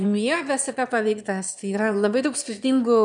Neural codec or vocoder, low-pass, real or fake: autoencoder, 22.05 kHz, a latent of 192 numbers a frame, VITS, trained on one speaker; 9.9 kHz; fake